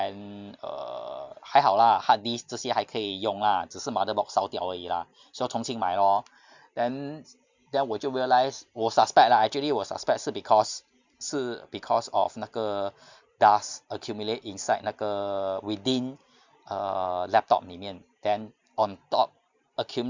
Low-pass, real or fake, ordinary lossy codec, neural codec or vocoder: 7.2 kHz; real; Opus, 64 kbps; none